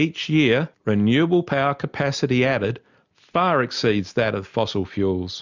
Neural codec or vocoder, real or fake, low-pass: none; real; 7.2 kHz